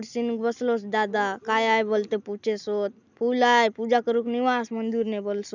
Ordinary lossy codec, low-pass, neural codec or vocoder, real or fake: none; 7.2 kHz; none; real